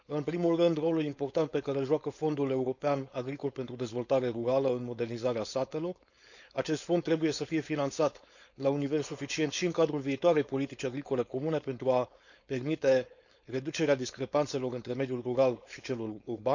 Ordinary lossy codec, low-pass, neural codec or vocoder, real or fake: none; 7.2 kHz; codec, 16 kHz, 4.8 kbps, FACodec; fake